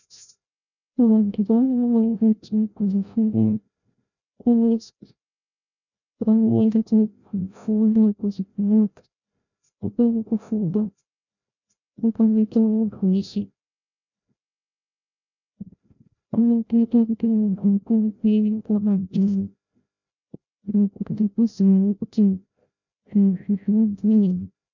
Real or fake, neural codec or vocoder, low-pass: fake; codec, 16 kHz, 0.5 kbps, FreqCodec, larger model; 7.2 kHz